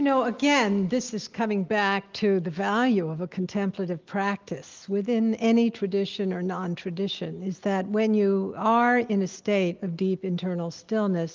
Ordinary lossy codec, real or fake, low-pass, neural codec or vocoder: Opus, 32 kbps; real; 7.2 kHz; none